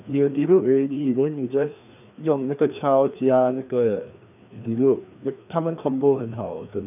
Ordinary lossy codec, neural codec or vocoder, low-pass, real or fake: none; codec, 16 kHz, 2 kbps, FreqCodec, larger model; 3.6 kHz; fake